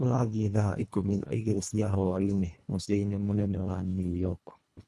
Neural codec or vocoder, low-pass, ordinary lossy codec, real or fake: codec, 24 kHz, 1.5 kbps, HILCodec; none; none; fake